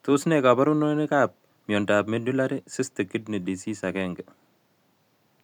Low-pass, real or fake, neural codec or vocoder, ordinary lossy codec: 19.8 kHz; real; none; none